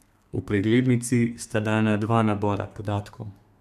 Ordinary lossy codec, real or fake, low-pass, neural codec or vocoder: none; fake; 14.4 kHz; codec, 32 kHz, 1.9 kbps, SNAC